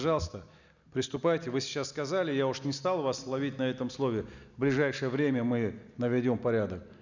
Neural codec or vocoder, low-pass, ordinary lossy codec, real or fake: none; 7.2 kHz; none; real